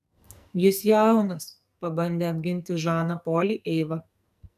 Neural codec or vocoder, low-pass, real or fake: codec, 44.1 kHz, 2.6 kbps, SNAC; 14.4 kHz; fake